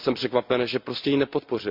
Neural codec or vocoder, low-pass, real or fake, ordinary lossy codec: none; 5.4 kHz; real; MP3, 48 kbps